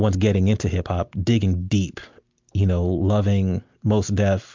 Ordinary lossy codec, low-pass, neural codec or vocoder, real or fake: MP3, 64 kbps; 7.2 kHz; none; real